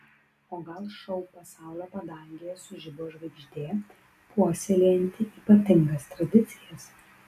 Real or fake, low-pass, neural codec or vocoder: real; 14.4 kHz; none